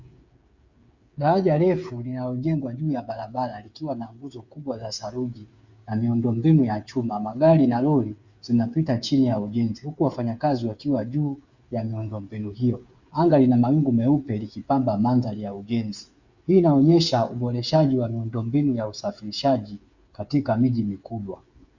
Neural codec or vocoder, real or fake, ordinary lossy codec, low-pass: codec, 16 kHz, 8 kbps, FreqCodec, smaller model; fake; Opus, 64 kbps; 7.2 kHz